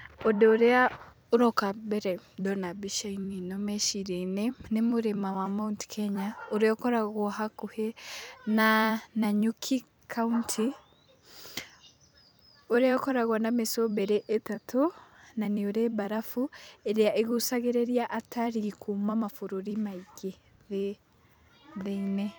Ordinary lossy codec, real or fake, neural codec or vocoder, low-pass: none; fake; vocoder, 44.1 kHz, 128 mel bands every 256 samples, BigVGAN v2; none